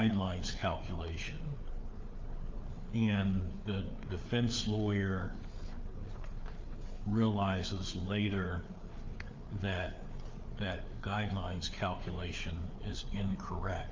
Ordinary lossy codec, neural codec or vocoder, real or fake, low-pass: Opus, 24 kbps; codec, 16 kHz, 4 kbps, FreqCodec, larger model; fake; 7.2 kHz